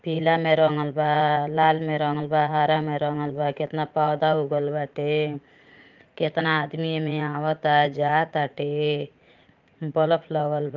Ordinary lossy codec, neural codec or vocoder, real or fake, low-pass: Opus, 32 kbps; vocoder, 44.1 kHz, 80 mel bands, Vocos; fake; 7.2 kHz